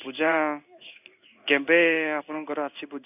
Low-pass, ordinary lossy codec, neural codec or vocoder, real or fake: 3.6 kHz; none; codec, 16 kHz in and 24 kHz out, 1 kbps, XY-Tokenizer; fake